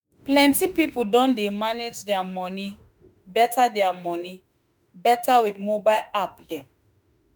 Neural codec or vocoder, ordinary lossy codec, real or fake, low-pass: autoencoder, 48 kHz, 32 numbers a frame, DAC-VAE, trained on Japanese speech; none; fake; none